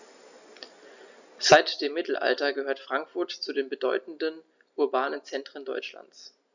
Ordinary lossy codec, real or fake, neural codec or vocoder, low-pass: none; real; none; 7.2 kHz